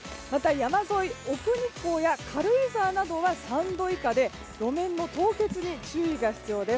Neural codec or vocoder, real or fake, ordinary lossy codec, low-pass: none; real; none; none